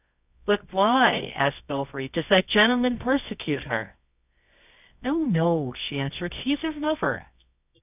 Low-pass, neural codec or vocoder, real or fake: 3.6 kHz; codec, 24 kHz, 0.9 kbps, WavTokenizer, medium music audio release; fake